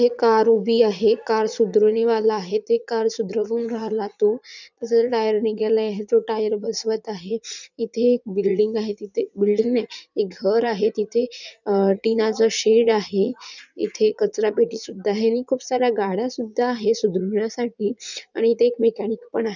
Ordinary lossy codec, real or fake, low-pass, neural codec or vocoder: none; fake; 7.2 kHz; vocoder, 44.1 kHz, 80 mel bands, Vocos